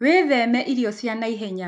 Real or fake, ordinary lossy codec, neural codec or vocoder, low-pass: real; none; none; 10.8 kHz